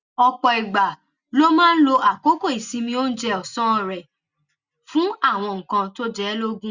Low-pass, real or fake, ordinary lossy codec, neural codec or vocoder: 7.2 kHz; real; Opus, 64 kbps; none